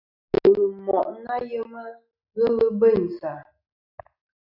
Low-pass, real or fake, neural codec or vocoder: 5.4 kHz; real; none